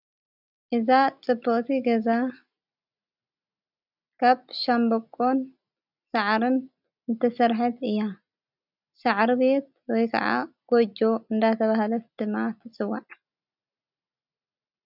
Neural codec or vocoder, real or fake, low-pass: none; real; 5.4 kHz